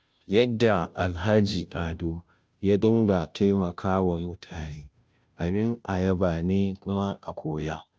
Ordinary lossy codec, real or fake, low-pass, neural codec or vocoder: none; fake; none; codec, 16 kHz, 0.5 kbps, FunCodec, trained on Chinese and English, 25 frames a second